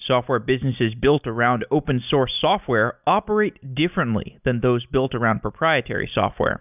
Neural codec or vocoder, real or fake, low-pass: none; real; 3.6 kHz